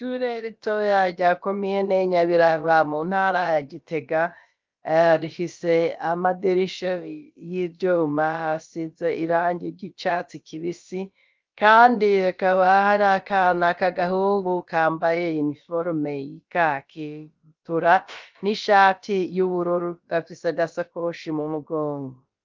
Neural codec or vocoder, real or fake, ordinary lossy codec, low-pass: codec, 16 kHz, about 1 kbps, DyCAST, with the encoder's durations; fake; Opus, 24 kbps; 7.2 kHz